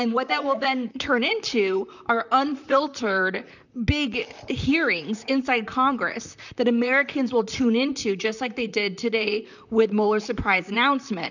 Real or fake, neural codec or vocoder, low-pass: fake; vocoder, 44.1 kHz, 128 mel bands, Pupu-Vocoder; 7.2 kHz